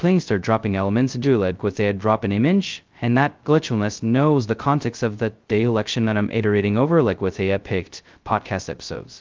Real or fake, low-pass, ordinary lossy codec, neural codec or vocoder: fake; 7.2 kHz; Opus, 32 kbps; codec, 16 kHz, 0.2 kbps, FocalCodec